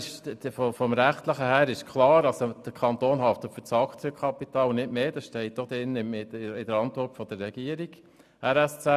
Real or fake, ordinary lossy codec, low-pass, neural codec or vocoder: real; none; 14.4 kHz; none